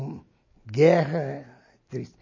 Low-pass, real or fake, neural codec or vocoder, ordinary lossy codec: 7.2 kHz; real; none; MP3, 32 kbps